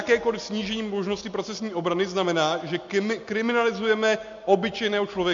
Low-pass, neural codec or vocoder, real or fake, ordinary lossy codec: 7.2 kHz; none; real; MP3, 48 kbps